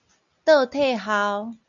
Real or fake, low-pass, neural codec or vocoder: real; 7.2 kHz; none